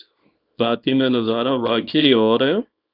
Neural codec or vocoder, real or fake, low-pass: codec, 24 kHz, 0.9 kbps, WavTokenizer, small release; fake; 5.4 kHz